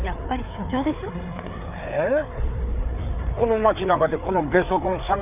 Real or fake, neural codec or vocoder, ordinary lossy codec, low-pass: fake; codec, 16 kHz, 4 kbps, FreqCodec, larger model; none; 3.6 kHz